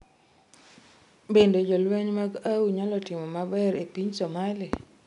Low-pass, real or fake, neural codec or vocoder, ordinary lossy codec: 10.8 kHz; real; none; none